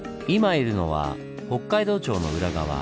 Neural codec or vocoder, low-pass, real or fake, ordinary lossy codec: none; none; real; none